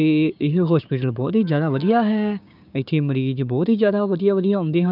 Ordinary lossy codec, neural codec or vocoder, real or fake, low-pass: none; codec, 16 kHz, 6 kbps, DAC; fake; 5.4 kHz